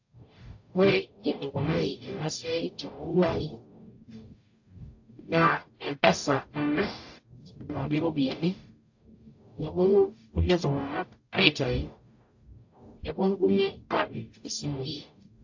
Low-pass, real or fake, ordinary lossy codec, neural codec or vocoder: 7.2 kHz; fake; AAC, 48 kbps; codec, 44.1 kHz, 0.9 kbps, DAC